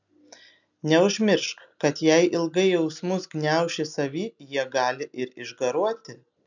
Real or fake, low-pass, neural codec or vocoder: real; 7.2 kHz; none